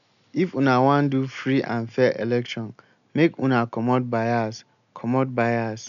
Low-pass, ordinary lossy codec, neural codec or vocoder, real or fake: 7.2 kHz; none; none; real